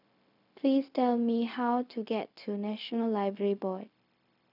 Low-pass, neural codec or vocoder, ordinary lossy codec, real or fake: 5.4 kHz; codec, 16 kHz, 0.4 kbps, LongCat-Audio-Codec; none; fake